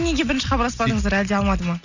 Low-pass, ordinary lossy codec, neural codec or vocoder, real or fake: 7.2 kHz; none; none; real